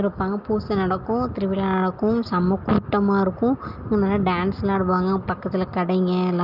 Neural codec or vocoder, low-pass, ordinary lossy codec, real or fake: none; 5.4 kHz; Opus, 24 kbps; real